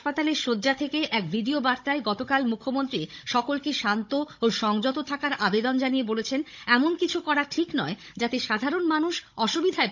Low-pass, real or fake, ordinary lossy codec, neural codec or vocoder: 7.2 kHz; fake; none; codec, 16 kHz, 16 kbps, FunCodec, trained on Chinese and English, 50 frames a second